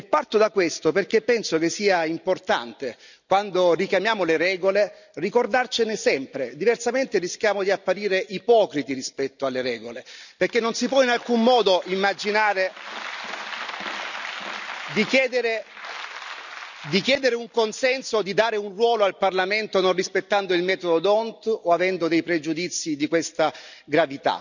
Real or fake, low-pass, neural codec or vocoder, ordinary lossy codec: real; 7.2 kHz; none; none